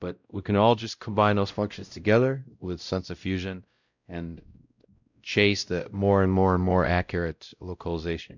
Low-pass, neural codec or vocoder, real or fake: 7.2 kHz; codec, 16 kHz, 0.5 kbps, X-Codec, WavLM features, trained on Multilingual LibriSpeech; fake